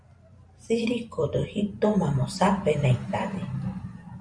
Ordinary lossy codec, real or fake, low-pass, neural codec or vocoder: Opus, 64 kbps; fake; 9.9 kHz; vocoder, 44.1 kHz, 128 mel bands every 512 samples, BigVGAN v2